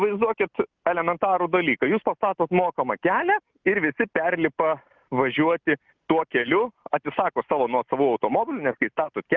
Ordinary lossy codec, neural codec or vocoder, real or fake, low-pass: Opus, 24 kbps; none; real; 7.2 kHz